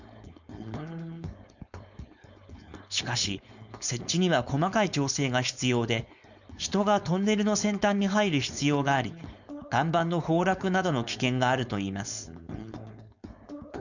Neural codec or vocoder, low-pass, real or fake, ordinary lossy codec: codec, 16 kHz, 4.8 kbps, FACodec; 7.2 kHz; fake; none